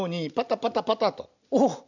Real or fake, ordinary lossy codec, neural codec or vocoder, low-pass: real; none; none; 7.2 kHz